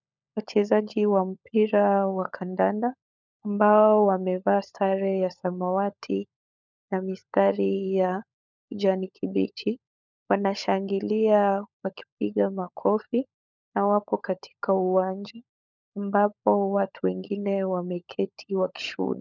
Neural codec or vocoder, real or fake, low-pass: codec, 16 kHz, 16 kbps, FunCodec, trained on LibriTTS, 50 frames a second; fake; 7.2 kHz